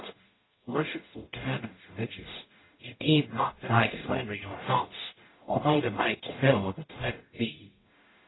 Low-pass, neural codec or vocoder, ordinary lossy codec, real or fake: 7.2 kHz; codec, 44.1 kHz, 0.9 kbps, DAC; AAC, 16 kbps; fake